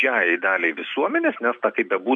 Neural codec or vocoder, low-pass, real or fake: none; 9.9 kHz; real